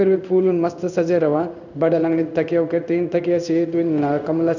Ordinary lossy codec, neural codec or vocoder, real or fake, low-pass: none; codec, 16 kHz in and 24 kHz out, 1 kbps, XY-Tokenizer; fake; 7.2 kHz